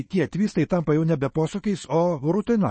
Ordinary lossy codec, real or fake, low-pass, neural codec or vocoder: MP3, 32 kbps; fake; 9.9 kHz; autoencoder, 48 kHz, 128 numbers a frame, DAC-VAE, trained on Japanese speech